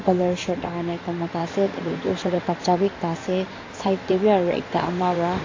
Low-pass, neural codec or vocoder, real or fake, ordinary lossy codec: 7.2 kHz; codec, 16 kHz in and 24 kHz out, 2.2 kbps, FireRedTTS-2 codec; fake; AAC, 32 kbps